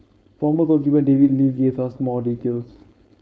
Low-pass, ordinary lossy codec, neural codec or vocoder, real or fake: none; none; codec, 16 kHz, 4.8 kbps, FACodec; fake